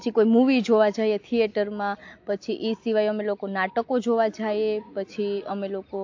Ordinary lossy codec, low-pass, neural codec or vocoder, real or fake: AAC, 48 kbps; 7.2 kHz; none; real